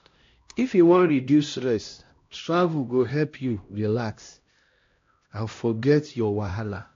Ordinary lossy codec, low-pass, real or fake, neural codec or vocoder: AAC, 48 kbps; 7.2 kHz; fake; codec, 16 kHz, 1 kbps, X-Codec, HuBERT features, trained on LibriSpeech